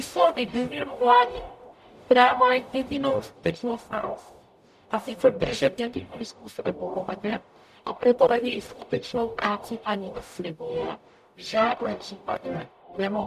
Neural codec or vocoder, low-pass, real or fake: codec, 44.1 kHz, 0.9 kbps, DAC; 14.4 kHz; fake